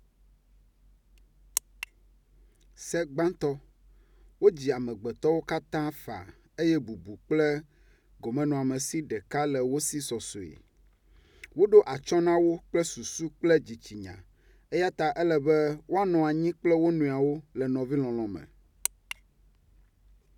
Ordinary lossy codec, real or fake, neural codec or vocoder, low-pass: none; real; none; 19.8 kHz